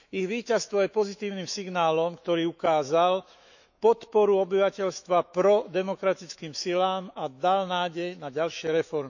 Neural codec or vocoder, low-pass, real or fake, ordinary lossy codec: autoencoder, 48 kHz, 128 numbers a frame, DAC-VAE, trained on Japanese speech; 7.2 kHz; fake; none